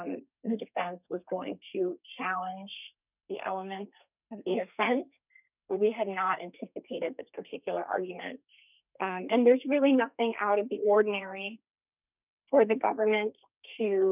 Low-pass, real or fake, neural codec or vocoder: 3.6 kHz; fake; codec, 32 kHz, 1.9 kbps, SNAC